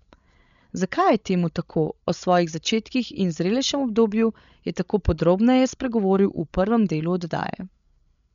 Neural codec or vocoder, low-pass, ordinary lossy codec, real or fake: codec, 16 kHz, 16 kbps, FreqCodec, larger model; 7.2 kHz; MP3, 96 kbps; fake